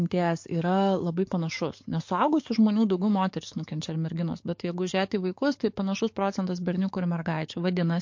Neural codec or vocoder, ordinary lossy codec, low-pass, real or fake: codec, 44.1 kHz, 7.8 kbps, DAC; MP3, 48 kbps; 7.2 kHz; fake